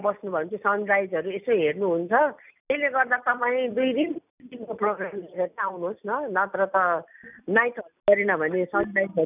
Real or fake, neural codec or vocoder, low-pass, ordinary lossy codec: real; none; 3.6 kHz; none